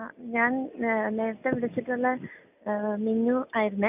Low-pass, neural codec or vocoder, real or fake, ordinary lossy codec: 3.6 kHz; none; real; none